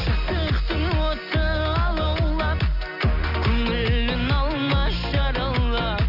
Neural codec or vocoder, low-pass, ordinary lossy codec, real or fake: none; 5.4 kHz; none; real